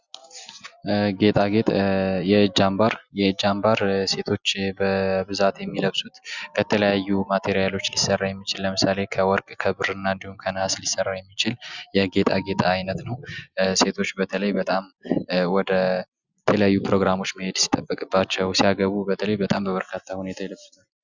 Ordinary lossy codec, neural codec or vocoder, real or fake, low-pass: Opus, 64 kbps; none; real; 7.2 kHz